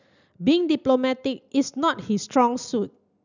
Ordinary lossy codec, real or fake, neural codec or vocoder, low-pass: none; real; none; 7.2 kHz